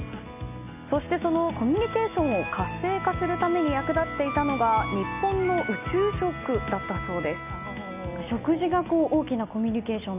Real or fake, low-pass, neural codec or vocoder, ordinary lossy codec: real; 3.6 kHz; none; none